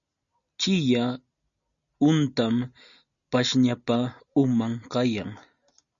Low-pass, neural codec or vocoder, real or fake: 7.2 kHz; none; real